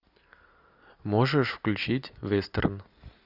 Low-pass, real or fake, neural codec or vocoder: 5.4 kHz; real; none